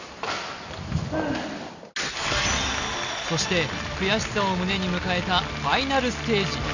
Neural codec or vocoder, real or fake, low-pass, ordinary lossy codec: none; real; 7.2 kHz; none